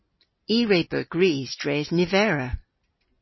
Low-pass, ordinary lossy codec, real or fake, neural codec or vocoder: 7.2 kHz; MP3, 24 kbps; real; none